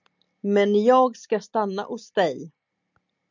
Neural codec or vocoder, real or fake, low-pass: none; real; 7.2 kHz